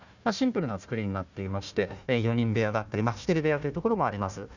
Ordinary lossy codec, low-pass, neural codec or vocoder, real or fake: none; 7.2 kHz; codec, 16 kHz, 1 kbps, FunCodec, trained on Chinese and English, 50 frames a second; fake